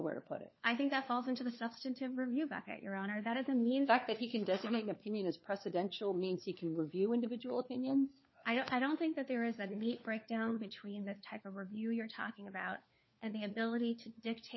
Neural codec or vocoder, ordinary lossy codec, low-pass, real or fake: codec, 16 kHz, 4 kbps, FunCodec, trained on LibriTTS, 50 frames a second; MP3, 24 kbps; 7.2 kHz; fake